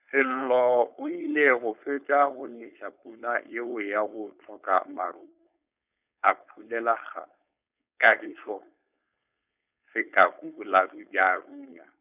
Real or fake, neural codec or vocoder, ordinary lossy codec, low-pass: fake; codec, 16 kHz, 4.8 kbps, FACodec; none; 3.6 kHz